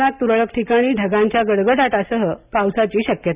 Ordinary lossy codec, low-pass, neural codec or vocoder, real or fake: Opus, 64 kbps; 3.6 kHz; none; real